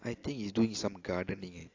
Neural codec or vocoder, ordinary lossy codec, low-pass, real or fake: none; AAC, 48 kbps; 7.2 kHz; real